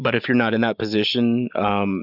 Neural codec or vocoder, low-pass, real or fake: none; 5.4 kHz; real